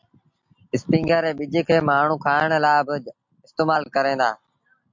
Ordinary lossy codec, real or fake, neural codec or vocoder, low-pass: MP3, 48 kbps; real; none; 7.2 kHz